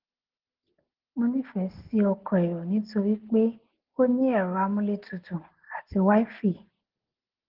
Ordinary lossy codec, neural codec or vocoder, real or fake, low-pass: Opus, 16 kbps; none; real; 5.4 kHz